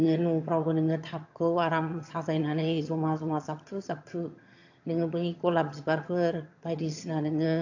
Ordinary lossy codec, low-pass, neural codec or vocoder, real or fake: AAC, 48 kbps; 7.2 kHz; vocoder, 22.05 kHz, 80 mel bands, HiFi-GAN; fake